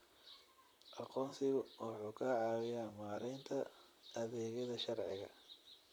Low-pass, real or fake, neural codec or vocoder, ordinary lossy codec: none; fake; vocoder, 44.1 kHz, 128 mel bands, Pupu-Vocoder; none